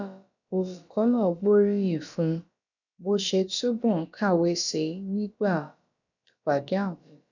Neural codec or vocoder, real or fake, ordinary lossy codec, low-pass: codec, 16 kHz, about 1 kbps, DyCAST, with the encoder's durations; fake; none; 7.2 kHz